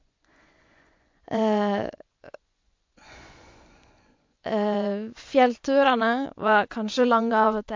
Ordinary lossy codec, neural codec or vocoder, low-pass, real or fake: MP3, 48 kbps; vocoder, 24 kHz, 100 mel bands, Vocos; 7.2 kHz; fake